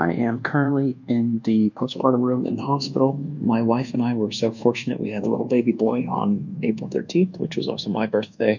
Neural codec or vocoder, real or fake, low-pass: codec, 24 kHz, 1.2 kbps, DualCodec; fake; 7.2 kHz